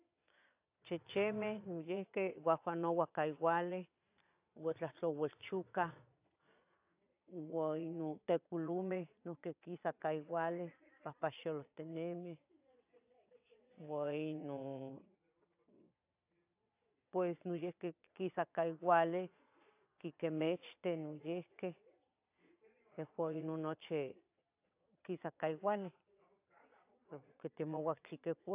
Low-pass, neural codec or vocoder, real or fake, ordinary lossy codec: 3.6 kHz; vocoder, 22.05 kHz, 80 mel bands, Vocos; fake; AAC, 32 kbps